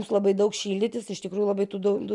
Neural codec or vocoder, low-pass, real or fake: none; 10.8 kHz; real